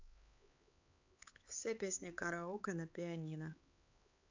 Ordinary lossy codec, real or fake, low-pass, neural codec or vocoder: AAC, 48 kbps; fake; 7.2 kHz; codec, 16 kHz, 4 kbps, X-Codec, HuBERT features, trained on LibriSpeech